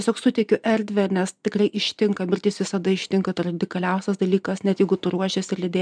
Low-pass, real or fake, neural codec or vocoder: 9.9 kHz; real; none